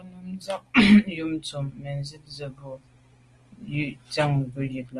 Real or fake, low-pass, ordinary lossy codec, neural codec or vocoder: real; 10.8 kHz; Opus, 24 kbps; none